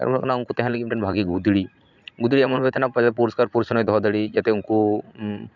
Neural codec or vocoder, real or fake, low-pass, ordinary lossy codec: vocoder, 44.1 kHz, 80 mel bands, Vocos; fake; 7.2 kHz; none